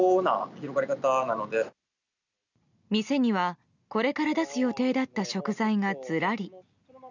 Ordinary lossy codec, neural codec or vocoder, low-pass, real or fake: none; none; 7.2 kHz; real